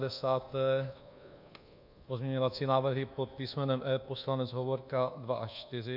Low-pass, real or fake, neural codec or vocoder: 5.4 kHz; fake; codec, 24 kHz, 1.2 kbps, DualCodec